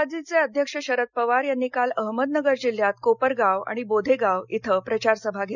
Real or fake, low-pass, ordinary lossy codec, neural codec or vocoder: real; 7.2 kHz; none; none